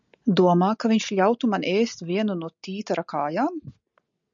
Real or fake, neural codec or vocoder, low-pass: real; none; 7.2 kHz